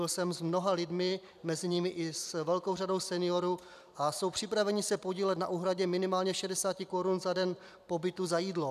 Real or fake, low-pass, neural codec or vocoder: fake; 14.4 kHz; vocoder, 44.1 kHz, 128 mel bands every 256 samples, BigVGAN v2